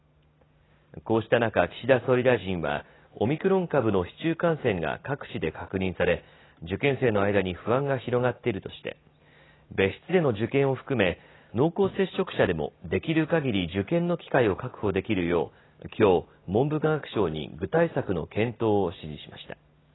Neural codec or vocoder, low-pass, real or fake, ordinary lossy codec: none; 7.2 kHz; real; AAC, 16 kbps